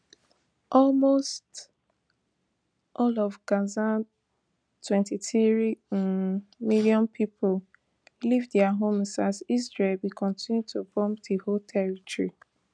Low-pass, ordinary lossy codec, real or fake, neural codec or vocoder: 9.9 kHz; none; real; none